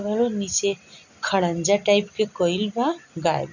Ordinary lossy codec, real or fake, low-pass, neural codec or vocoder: none; real; 7.2 kHz; none